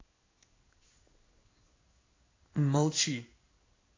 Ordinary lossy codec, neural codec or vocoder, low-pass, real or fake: AAC, 32 kbps; codec, 16 kHz in and 24 kHz out, 1 kbps, XY-Tokenizer; 7.2 kHz; fake